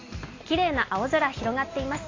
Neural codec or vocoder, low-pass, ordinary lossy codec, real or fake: none; 7.2 kHz; AAC, 32 kbps; real